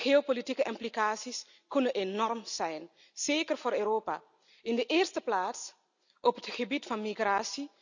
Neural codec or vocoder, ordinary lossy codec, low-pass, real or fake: none; none; 7.2 kHz; real